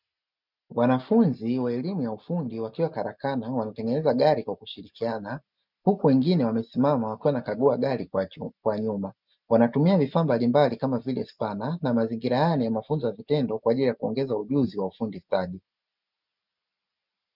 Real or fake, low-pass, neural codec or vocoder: real; 5.4 kHz; none